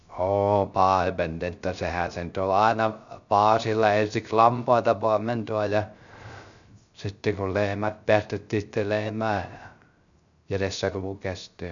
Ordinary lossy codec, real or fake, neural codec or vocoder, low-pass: none; fake; codec, 16 kHz, 0.3 kbps, FocalCodec; 7.2 kHz